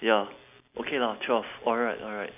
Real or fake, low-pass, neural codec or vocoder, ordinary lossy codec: real; 3.6 kHz; none; none